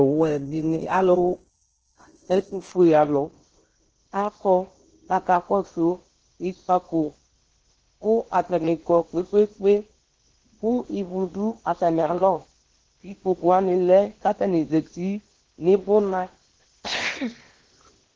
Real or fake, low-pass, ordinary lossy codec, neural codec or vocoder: fake; 7.2 kHz; Opus, 16 kbps; codec, 16 kHz in and 24 kHz out, 0.8 kbps, FocalCodec, streaming, 65536 codes